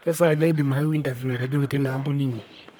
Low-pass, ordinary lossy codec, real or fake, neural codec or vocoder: none; none; fake; codec, 44.1 kHz, 1.7 kbps, Pupu-Codec